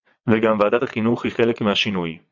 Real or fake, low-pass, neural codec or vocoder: fake; 7.2 kHz; vocoder, 22.05 kHz, 80 mel bands, WaveNeXt